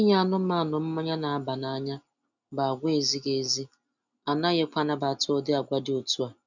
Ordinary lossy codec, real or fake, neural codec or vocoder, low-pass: none; real; none; 7.2 kHz